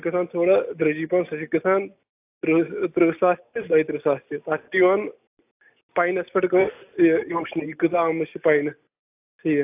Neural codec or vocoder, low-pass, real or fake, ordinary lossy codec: none; 3.6 kHz; real; AAC, 32 kbps